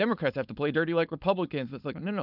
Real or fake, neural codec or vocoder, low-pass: fake; codec, 16 kHz, 4.8 kbps, FACodec; 5.4 kHz